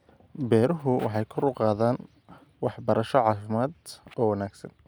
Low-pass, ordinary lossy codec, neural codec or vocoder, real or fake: none; none; none; real